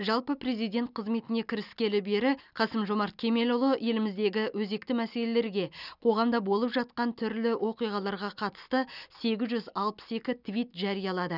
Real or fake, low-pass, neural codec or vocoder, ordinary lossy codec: real; 5.4 kHz; none; none